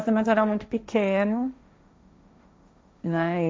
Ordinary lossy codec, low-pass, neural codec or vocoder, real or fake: none; none; codec, 16 kHz, 1.1 kbps, Voila-Tokenizer; fake